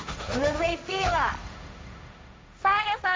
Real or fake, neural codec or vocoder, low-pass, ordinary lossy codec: fake; codec, 16 kHz, 1.1 kbps, Voila-Tokenizer; none; none